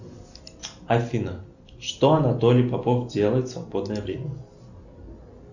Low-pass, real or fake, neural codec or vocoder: 7.2 kHz; real; none